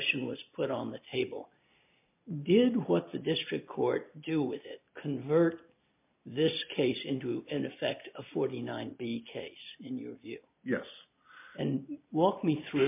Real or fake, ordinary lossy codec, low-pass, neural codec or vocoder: real; MP3, 32 kbps; 3.6 kHz; none